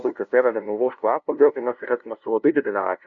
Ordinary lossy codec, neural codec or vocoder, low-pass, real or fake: MP3, 96 kbps; codec, 16 kHz, 0.5 kbps, FunCodec, trained on LibriTTS, 25 frames a second; 7.2 kHz; fake